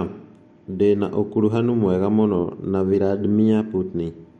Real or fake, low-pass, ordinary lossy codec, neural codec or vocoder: real; 19.8 kHz; MP3, 48 kbps; none